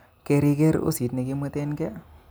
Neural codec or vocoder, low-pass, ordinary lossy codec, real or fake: none; none; none; real